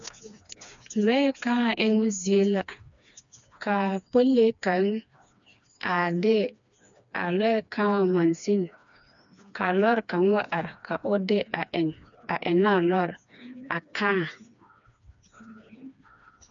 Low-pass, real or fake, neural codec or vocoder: 7.2 kHz; fake; codec, 16 kHz, 2 kbps, FreqCodec, smaller model